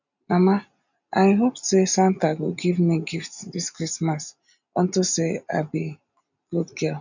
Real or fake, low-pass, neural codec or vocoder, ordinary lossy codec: real; 7.2 kHz; none; none